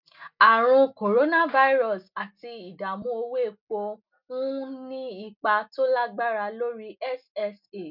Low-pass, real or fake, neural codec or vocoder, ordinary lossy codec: 5.4 kHz; real; none; none